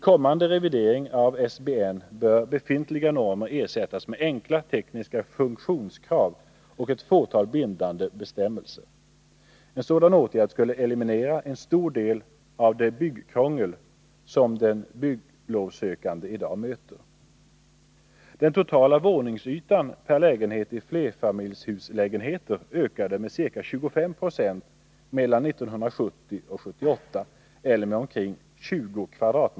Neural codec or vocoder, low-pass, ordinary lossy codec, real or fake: none; none; none; real